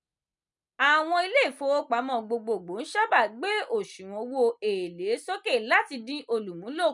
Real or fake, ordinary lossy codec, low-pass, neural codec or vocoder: real; none; 10.8 kHz; none